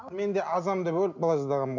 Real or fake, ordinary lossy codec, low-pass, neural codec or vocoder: real; none; 7.2 kHz; none